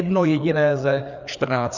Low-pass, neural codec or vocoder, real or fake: 7.2 kHz; codec, 16 kHz, 4 kbps, FreqCodec, larger model; fake